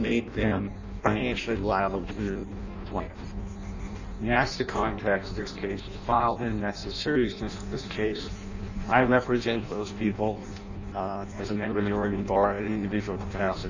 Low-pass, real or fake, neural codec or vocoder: 7.2 kHz; fake; codec, 16 kHz in and 24 kHz out, 0.6 kbps, FireRedTTS-2 codec